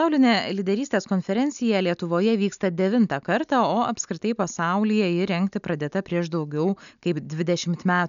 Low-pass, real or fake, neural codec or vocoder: 7.2 kHz; real; none